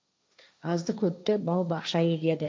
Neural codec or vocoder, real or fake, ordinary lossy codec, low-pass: codec, 16 kHz, 1.1 kbps, Voila-Tokenizer; fake; none; none